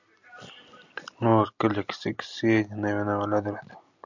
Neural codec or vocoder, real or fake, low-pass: none; real; 7.2 kHz